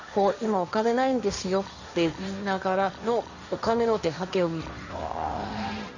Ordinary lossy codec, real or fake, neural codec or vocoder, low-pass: none; fake; codec, 16 kHz, 1.1 kbps, Voila-Tokenizer; 7.2 kHz